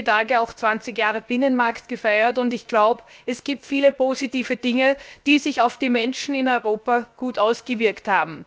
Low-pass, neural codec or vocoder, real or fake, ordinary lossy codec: none; codec, 16 kHz, 0.7 kbps, FocalCodec; fake; none